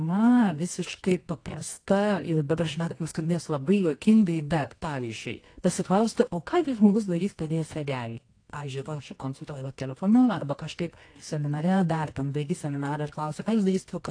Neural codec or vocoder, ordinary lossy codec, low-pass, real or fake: codec, 24 kHz, 0.9 kbps, WavTokenizer, medium music audio release; AAC, 48 kbps; 9.9 kHz; fake